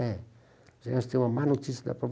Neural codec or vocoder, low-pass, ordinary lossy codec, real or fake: none; none; none; real